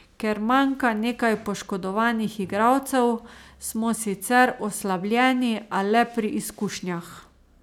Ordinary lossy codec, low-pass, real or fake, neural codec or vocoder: none; 19.8 kHz; real; none